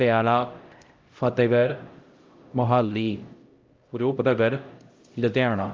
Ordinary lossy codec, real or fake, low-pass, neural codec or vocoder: Opus, 32 kbps; fake; 7.2 kHz; codec, 16 kHz, 0.5 kbps, X-Codec, HuBERT features, trained on LibriSpeech